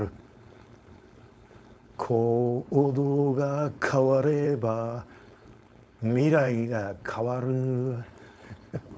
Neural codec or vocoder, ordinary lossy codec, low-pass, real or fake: codec, 16 kHz, 4.8 kbps, FACodec; none; none; fake